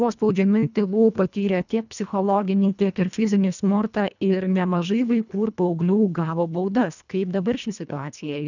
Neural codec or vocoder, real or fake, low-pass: codec, 24 kHz, 1.5 kbps, HILCodec; fake; 7.2 kHz